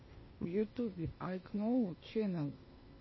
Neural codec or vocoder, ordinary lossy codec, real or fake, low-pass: codec, 16 kHz, 0.8 kbps, ZipCodec; MP3, 24 kbps; fake; 7.2 kHz